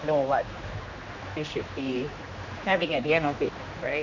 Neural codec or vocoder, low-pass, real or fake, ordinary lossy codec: codec, 16 kHz, 2 kbps, X-Codec, HuBERT features, trained on general audio; 7.2 kHz; fake; none